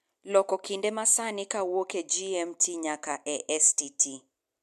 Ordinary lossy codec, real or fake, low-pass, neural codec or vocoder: MP3, 96 kbps; real; 14.4 kHz; none